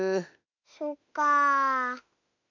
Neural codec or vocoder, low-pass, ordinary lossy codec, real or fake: autoencoder, 48 kHz, 32 numbers a frame, DAC-VAE, trained on Japanese speech; 7.2 kHz; none; fake